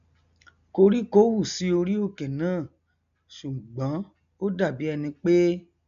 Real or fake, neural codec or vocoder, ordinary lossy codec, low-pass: real; none; none; 7.2 kHz